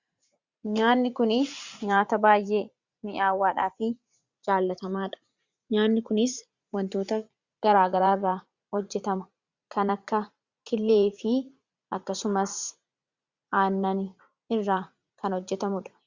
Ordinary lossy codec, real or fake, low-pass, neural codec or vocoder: Opus, 64 kbps; fake; 7.2 kHz; vocoder, 44.1 kHz, 80 mel bands, Vocos